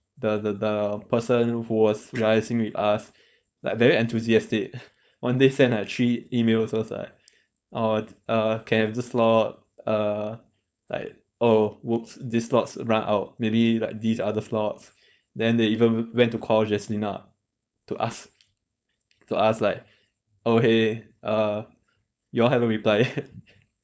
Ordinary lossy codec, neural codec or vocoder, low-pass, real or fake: none; codec, 16 kHz, 4.8 kbps, FACodec; none; fake